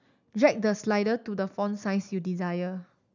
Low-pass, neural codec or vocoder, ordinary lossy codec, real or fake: 7.2 kHz; none; none; real